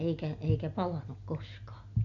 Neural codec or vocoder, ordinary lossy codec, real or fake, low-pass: none; none; real; 7.2 kHz